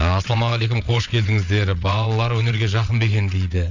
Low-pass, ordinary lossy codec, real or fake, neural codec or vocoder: 7.2 kHz; none; fake; vocoder, 22.05 kHz, 80 mel bands, WaveNeXt